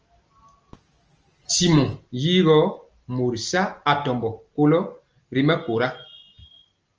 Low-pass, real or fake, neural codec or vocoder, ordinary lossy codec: 7.2 kHz; real; none; Opus, 24 kbps